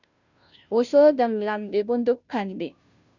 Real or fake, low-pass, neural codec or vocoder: fake; 7.2 kHz; codec, 16 kHz, 0.5 kbps, FunCodec, trained on Chinese and English, 25 frames a second